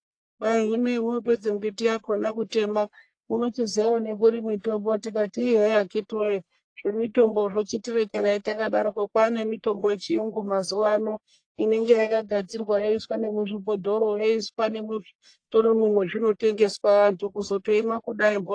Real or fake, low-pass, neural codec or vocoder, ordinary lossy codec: fake; 9.9 kHz; codec, 44.1 kHz, 1.7 kbps, Pupu-Codec; AAC, 48 kbps